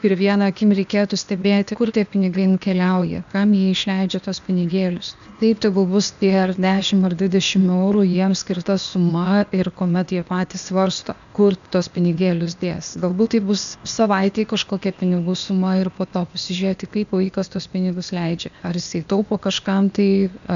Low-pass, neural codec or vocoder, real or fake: 7.2 kHz; codec, 16 kHz, 0.8 kbps, ZipCodec; fake